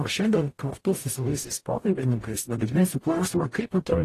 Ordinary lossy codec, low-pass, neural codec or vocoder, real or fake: AAC, 48 kbps; 14.4 kHz; codec, 44.1 kHz, 0.9 kbps, DAC; fake